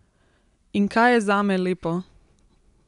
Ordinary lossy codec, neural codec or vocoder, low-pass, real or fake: none; none; 10.8 kHz; real